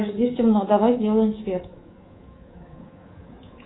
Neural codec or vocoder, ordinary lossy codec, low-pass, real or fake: codec, 24 kHz, 3.1 kbps, DualCodec; AAC, 16 kbps; 7.2 kHz; fake